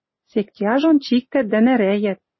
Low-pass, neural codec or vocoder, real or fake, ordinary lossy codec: 7.2 kHz; none; real; MP3, 24 kbps